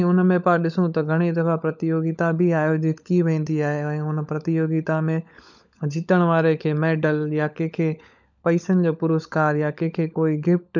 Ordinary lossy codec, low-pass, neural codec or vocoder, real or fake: none; 7.2 kHz; codec, 16 kHz, 16 kbps, FunCodec, trained on LibriTTS, 50 frames a second; fake